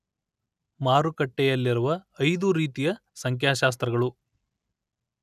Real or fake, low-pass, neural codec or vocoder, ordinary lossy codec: real; 14.4 kHz; none; none